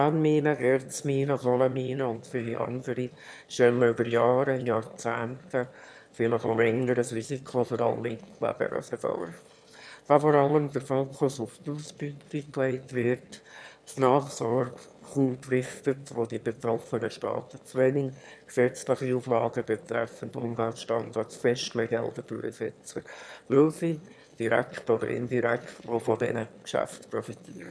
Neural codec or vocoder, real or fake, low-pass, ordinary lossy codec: autoencoder, 22.05 kHz, a latent of 192 numbers a frame, VITS, trained on one speaker; fake; none; none